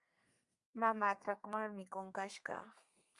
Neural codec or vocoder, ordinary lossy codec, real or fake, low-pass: codec, 32 kHz, 1.9 kbps, SNAC; Opus, 64 kbps; fake; 10.8 kHz